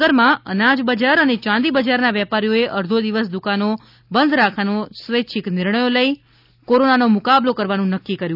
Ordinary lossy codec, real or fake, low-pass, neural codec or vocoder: none; real; 5.4 kHz; none